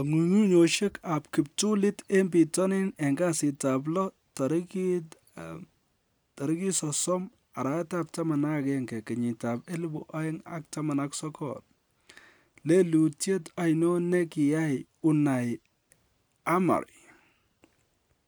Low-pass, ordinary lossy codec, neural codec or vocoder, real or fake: none; none; none; real